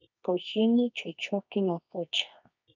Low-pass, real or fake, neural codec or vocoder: 7.2 kHz; fake; codec, 24 kHz, 0.9 kbps, WavTokenizer, medium music audio release